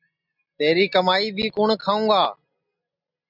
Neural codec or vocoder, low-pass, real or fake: none; 5.4 kHz; real